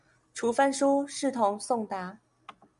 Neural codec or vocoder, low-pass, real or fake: none; 10.8 kHz; real